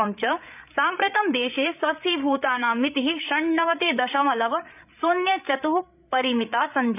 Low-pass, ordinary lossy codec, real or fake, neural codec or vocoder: 3.6 kHz; none; fake; codec, 16 kHz, 8 kbps, FreqCodec, larger model